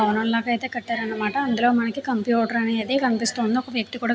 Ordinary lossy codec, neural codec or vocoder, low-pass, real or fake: none; none; none; real